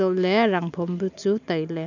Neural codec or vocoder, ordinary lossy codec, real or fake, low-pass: none; none; real; 7.2 kHz